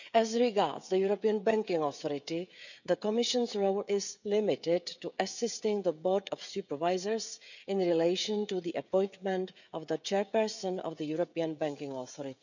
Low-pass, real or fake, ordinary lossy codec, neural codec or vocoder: 7.2 kHz; fake; none; codec, 16 kHz, 16 kbps, FreqCodec, smaller model